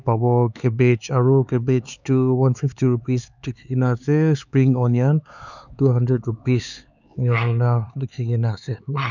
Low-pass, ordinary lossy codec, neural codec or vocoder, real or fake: 7.2 kHz; none; codec, 16 kHz, 4 kbps, X-Codec, HuBERT features, trained on LibriSpeech; fake